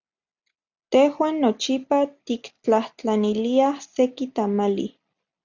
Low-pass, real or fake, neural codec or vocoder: 7.2 kHz; real; none